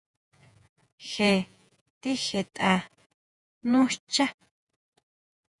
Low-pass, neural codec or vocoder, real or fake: 10.8 kHz; vocoder, 48 kHz, 128 mel bands, Vocos; fake